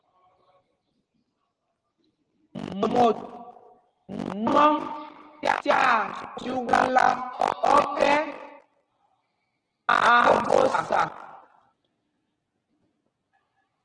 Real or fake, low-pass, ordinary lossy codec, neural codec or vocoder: real; 9.9 kHz; Opus, 16 kbps; none